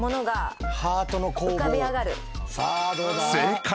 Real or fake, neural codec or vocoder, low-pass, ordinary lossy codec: real; none; none; none